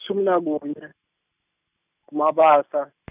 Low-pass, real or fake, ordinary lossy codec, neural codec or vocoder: 3.6 kHz; real; none; none